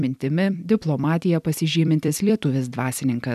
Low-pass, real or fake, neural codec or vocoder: 14.4 kHz; fake; vocoder, 44.1 kHz, 128 mel bands every 256 samples, BigVGAN v2